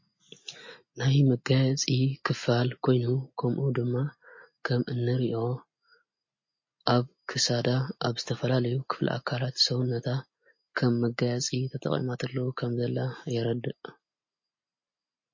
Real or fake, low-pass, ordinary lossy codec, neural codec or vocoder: real; 7.2 kHz; MP3, 32 kbps; none